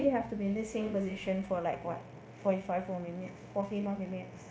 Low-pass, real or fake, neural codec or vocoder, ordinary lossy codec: none; fake; codec, 16 kHz, 0.9 kbps, LongCat-Audio-Codec; none